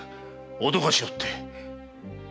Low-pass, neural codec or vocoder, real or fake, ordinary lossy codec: none; none; real; none